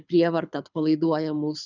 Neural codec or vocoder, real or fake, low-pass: codec, 24 kHz, 6 kbps, HILCodec; fake; 7.2 kHz